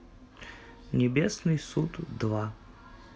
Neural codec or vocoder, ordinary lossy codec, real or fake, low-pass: none; none; real; none